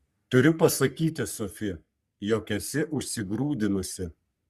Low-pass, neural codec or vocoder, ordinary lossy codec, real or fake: 14.4 kHz; codec, 44.1 kHz, 3.4 kbps, Pupu-Codec; Opus, 64 kbps; fake